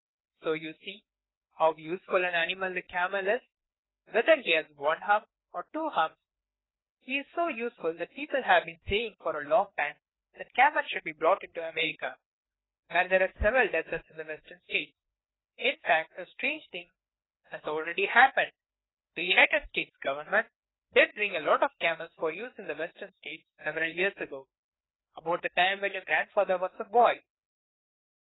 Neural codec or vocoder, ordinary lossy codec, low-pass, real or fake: codec, 16 kHz, 2 kbps, FreqCodec, larger model; AAC, 16 kbps; 7.2 kHz; fake